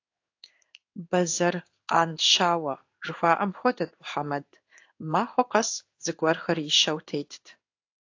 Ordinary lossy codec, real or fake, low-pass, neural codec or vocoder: AAC, 48 kbps; fake; 7.2 kHz; codec, 16 kHz in and 24 kHz out, 1 kbps, XY-Tokenizer